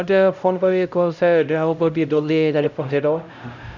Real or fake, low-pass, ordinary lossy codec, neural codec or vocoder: fake; 7.2 kHz; none; codec, 16 kHz, 0.5 kbps, X-Codec, HuBERT features, trained on LibriSpeech